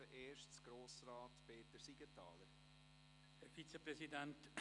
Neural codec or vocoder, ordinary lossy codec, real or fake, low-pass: none; none; real; 10.8 kHz